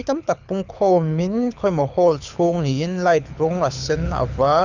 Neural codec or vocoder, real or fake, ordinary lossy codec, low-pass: codec, 24 kHz, 6 kbps, HILCodec; fake; none; 7.2 kHz